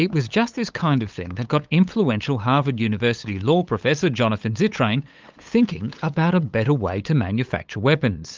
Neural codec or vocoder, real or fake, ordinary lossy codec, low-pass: codec, 16 kHz, 8 kbps, FunCodec, trained on LibriTTS, 25 frames a second; fake; Opus, 24 kbps; 7.2 kHz